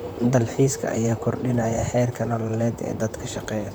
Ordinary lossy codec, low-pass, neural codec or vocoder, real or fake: none; none; vocoder, 44.1 kHz, 128 mel bands, Pupu-Vocoder; fake